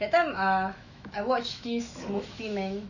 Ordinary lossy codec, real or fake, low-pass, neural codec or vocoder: none; fake; 7.2 kHz; autoencoder, 48 kHz, 128 numbers a frame, DAC-VAE, trained on Japanese speech